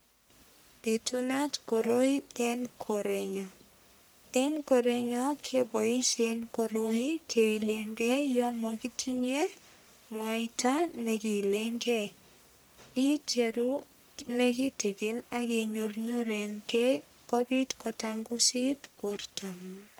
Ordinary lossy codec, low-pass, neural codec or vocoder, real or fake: none; none; codec, 44.1 kHz, 1.7 kbps, Pupu-Codec; fake